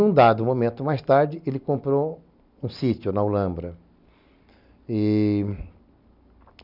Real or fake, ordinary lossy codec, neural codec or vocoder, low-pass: real; none; none; 5.4 kHz